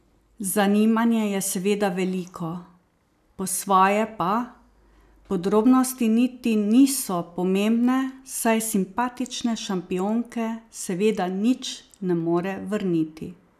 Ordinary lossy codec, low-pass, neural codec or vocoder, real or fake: none; 14.4 kHz; none; real